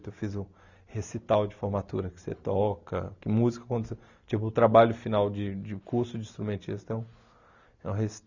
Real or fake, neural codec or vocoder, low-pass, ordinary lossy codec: real; none; 7.2 kHz; none